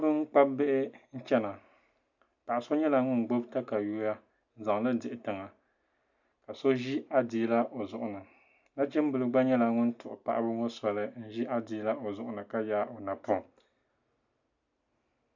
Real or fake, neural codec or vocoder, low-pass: real; none; 7.2 kHz